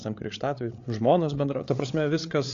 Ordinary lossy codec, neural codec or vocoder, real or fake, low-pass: AAC, 48 kbps; codec, 16 kHz, 8 kbps, FreqCodec, larger model; fake; 7.2 kHz